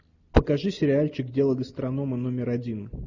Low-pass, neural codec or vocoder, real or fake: 7.2 kHz; none; real